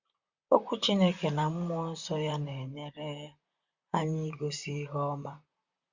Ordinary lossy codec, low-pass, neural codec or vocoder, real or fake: Opus, 64 kbps; 7.2 kHz; vocoder, 44.1 kHz, 128 mel bands, Pupu-Vocoder; fake